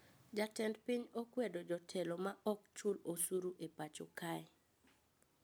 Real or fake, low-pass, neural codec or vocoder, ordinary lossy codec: real; none; none; none